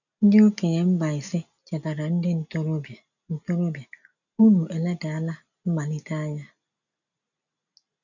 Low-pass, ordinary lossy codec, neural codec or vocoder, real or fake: 7.2 kHz; none; none; real